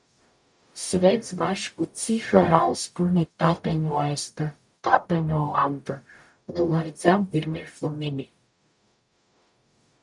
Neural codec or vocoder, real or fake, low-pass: codec, 44.1 kHz, 0.9 kbps, DAC; fake; 10.8 kHz